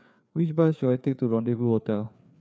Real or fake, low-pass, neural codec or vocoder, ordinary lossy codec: fake; none; codec, 16 kHz, 4 kbps, FreqCodec, larger model; none